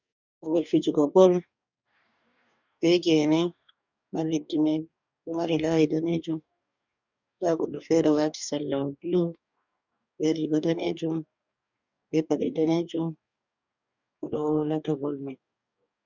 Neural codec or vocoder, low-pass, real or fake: codec, 44.1 kHz, 2.6 kbps, DAC; 7.2 kHz; fake